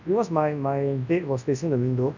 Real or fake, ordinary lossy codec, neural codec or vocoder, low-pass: fake; none; codec, 24 kHz, 0.9 kbps, WavTokenizer, large speech release; 7.2 kHz